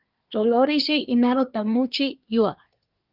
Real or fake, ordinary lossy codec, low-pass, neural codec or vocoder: fake; Opus, 32 kbps; 5.4 kHz; codec, 24 kHz, 1 kbps, SNAC